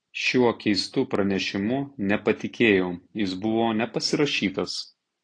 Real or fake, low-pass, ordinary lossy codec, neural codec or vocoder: real; 9.9 kHz; AAC, 48 kbps; none